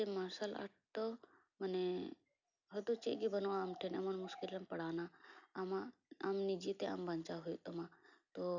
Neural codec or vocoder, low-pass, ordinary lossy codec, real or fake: none; 7.2 kHz; none; real